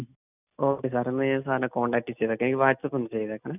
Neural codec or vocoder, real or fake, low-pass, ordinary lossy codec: none; real; 3.6 kHz; none